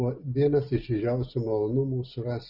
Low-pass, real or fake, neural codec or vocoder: 5.4 kHz; real; none